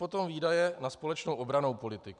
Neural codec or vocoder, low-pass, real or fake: none; 10.8 kHz; real